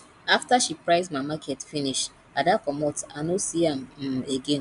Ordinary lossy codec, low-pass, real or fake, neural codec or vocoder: none; 10.8 kHz; real; none